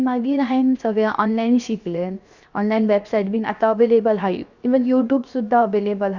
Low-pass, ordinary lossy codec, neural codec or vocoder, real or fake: 7.2 kHz; Opus, 64 kbps; codec, 16 kHz, 0.7 kbps, FocalCodec; fake